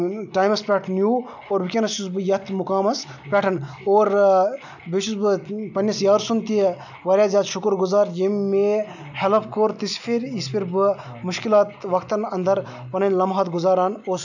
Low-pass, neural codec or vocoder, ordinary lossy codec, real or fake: 7.2 kHz; none; none; real